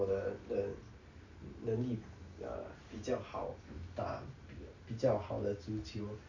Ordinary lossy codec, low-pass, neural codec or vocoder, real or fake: none; 7.2 kHz; none; real